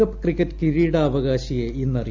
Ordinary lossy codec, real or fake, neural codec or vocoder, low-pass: MP3, 64 kbps; real; none; 7.2 kHz